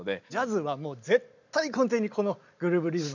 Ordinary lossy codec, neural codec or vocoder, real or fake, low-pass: none; none; real; 7.2 kHz